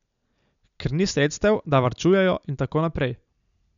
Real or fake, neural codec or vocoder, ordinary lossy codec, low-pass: real; none; none; 7.2 kHz